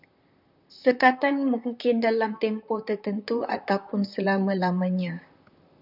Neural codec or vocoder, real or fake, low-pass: vocoder, 44.1 kHz, 128 mel bands, Pupu-Vocoder; fake; 5.4 kHz